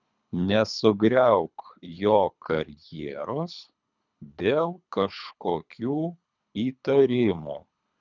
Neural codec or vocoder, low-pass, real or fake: codec, 24 kHz, 3 kbps, HILCodec; 7.2 kHz; fake